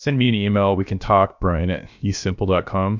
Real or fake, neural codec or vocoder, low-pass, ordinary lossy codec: fake; codec, 16 kHz, about 1 kbps, DyCAST, with the encoder's durations; 7.2 kHz; MP3, 64 kbps